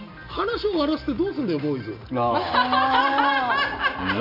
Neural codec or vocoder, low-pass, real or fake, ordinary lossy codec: none; 5.4 kHz; real; MP3, 48 kbps